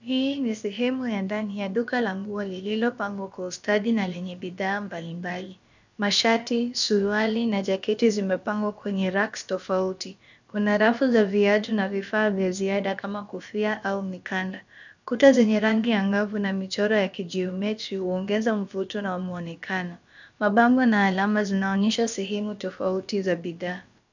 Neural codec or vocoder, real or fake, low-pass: codec, 16 kHz, about 1 kbps, DyCAST, with the encoder's durations; fake; 7.2 kHz